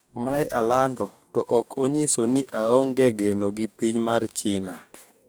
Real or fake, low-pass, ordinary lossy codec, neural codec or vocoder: fake; none; none; codec, 44.1 kHz, 2.6 kbps, DAC